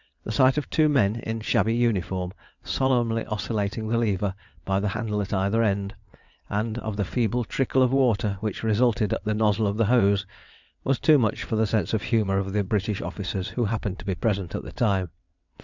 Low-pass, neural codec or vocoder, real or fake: 7.2 kHz; vocoder, 22.05 kHz, 80 mel bands, WaveNeXt; fake